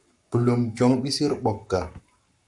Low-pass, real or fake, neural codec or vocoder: 10.8 kHz; fake; codec, 44.1 kHz, 7.8 kbps, Pupu-Codec